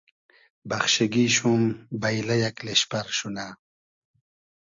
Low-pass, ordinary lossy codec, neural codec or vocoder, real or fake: 7.2 kHz; AAC, 64 kbps; none; real